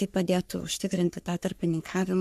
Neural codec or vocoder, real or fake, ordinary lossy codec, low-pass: codec, 44.1 kHz, 3.4 kbps, Pupu-Codec; fake; MP3, 96 kbps; 14.4 kHz